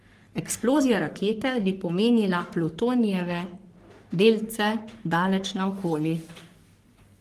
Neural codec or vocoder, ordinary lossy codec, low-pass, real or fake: codec, 44.1 kHz, 3.4 kbps, Pupu-Codec; Opus, 24 kbps; 14.4 kHz; fake